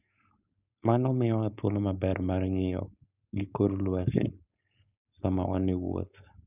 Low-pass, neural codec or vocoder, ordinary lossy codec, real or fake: 3.6 kHz; codec, 16 kHz, 4.8 kbps, FACodec; none; fake